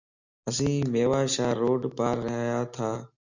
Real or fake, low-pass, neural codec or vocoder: real; 7.2 kHz; none